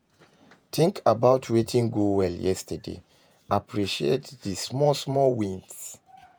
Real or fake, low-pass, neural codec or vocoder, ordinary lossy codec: fake; none; vocoder, 48 kHz, 128 mel bands, Vocos; none